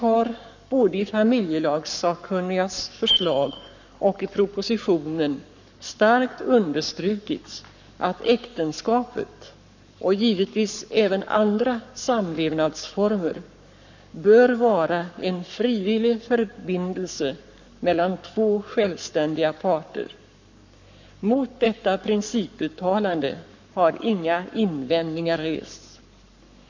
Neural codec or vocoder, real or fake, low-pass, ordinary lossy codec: codec, 44.1 kHz, 7.8 kbps, Pupu-Codec; fake; 7.2 kHz; none